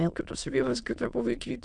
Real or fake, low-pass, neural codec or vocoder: fake; 9.9 kHz; autoencoder, 22.05 kHz, a latent of 192 numbers a frame, VITS, trained on many speakers